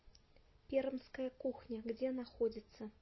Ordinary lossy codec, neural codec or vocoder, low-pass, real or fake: MP3, 24 kbps; none; 7.2 kHz; real